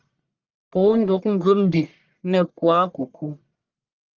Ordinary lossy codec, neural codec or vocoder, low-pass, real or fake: Opus, 24 kbps; codec, 44.1 kHz, 1.7 kbps, Pupu-Codec; 7.2 kHz; fake